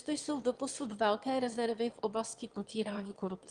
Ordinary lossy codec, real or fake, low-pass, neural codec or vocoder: Opus, 24 kbps; fake; 9.9 kHz; autoencoder, 22.05 kHz, a latent of 192 numbers a frame, VITS, trained on one speaker